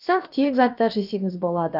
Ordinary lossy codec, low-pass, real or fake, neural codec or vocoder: none; 5.4 kHz; fake; codec, 16 kHz, about 1 kbps, DyCAST, with the encoder's durations